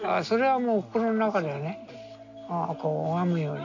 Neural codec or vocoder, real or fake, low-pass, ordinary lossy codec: none; real; 7.2 kHz; none